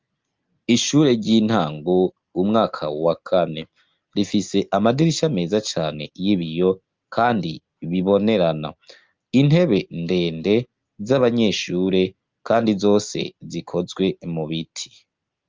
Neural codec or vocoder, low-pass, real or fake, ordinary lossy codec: none; 7.2 kHz; real; Opus, 24 kbps